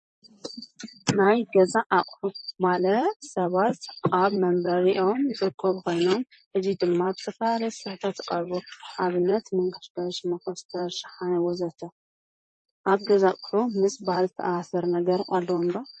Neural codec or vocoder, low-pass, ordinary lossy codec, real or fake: vocoder, 44.1 kHz, 128 mel bands, Pupu-Vocoder; 10.8 kHz; MP3, 32 kbps; fake